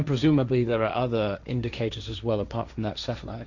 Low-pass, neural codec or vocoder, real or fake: 7.2 kHz; codec, 16 kHz, 1.1 kbps, Voila-Tokenizer; fake